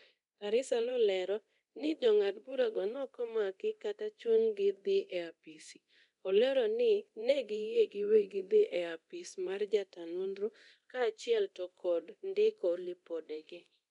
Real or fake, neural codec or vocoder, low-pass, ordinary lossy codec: fake; codec, 24 kHz, 0.9 kbps, DualCodec; 10.8 kHz; none